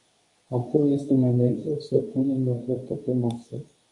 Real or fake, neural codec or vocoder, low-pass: fake; codec, 24 kHz, 0.9 kbps, WavTokenizer, medium speech release version 2; 10.8 kHz